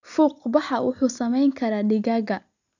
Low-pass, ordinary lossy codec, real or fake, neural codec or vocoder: 7.2 kHz; none; real; none